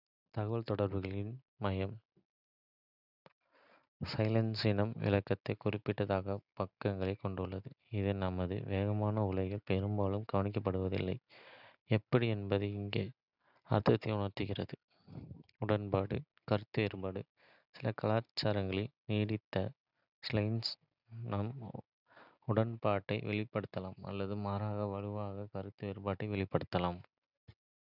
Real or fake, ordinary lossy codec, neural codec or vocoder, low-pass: real; none; none; 5.4 kHz